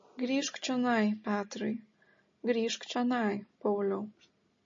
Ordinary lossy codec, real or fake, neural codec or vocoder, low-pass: MP3, 32 kbps; real; none; 7.2 kHz